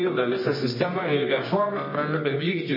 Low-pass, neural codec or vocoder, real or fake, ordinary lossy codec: 5.4 kHz; codec, 24 kHz, 0.9 kbps, WavTokenizer, medium music audio release; fake; MP3, 24 kbps